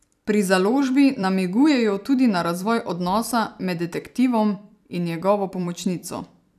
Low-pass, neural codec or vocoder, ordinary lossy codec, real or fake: 14.4 kHz; vocoder, 44.1 kHz, 128 mel bands every 256 samples, BigVGAN v2; none; fake